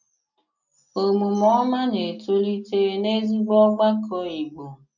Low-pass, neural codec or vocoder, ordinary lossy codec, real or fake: 7.2 kHz; none; none; real